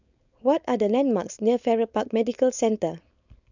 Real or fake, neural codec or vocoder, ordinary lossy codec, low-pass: fake; codec, 16 kHz, 4.8 kbps, FACodec; none; 7.2 kHz